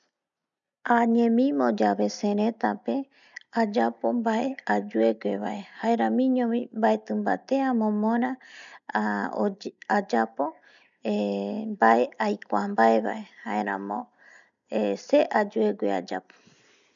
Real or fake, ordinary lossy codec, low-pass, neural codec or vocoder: real; none; 7.2 kHz; none